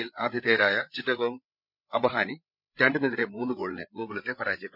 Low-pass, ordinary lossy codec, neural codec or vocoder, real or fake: 5.4 kHz; none; codec, 16 kHz, 8 kbps, FreqCodec, larger model; fake